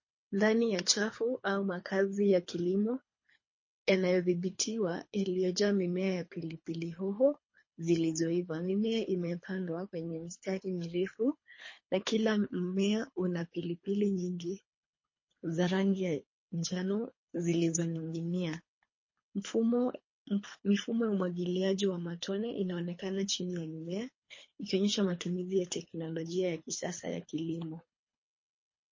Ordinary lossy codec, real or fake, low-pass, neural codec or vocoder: MP3, 32 kbps; fake; 7.2 kHz; codec, 24 kHz, 3 kbps, HILCodec